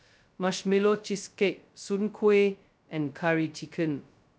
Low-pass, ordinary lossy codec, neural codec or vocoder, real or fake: none; none; codec, 16 kHz, 0.2 kbps, FocalCodec; fake